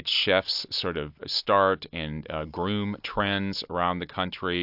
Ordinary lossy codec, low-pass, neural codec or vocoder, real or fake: AAC, 48 kbps; 5.4 kHz; none; real